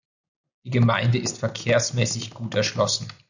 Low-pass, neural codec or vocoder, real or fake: 7.2 kHz; none; real